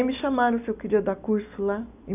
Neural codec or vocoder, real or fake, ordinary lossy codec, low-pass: none; real; none; 3.6 kHz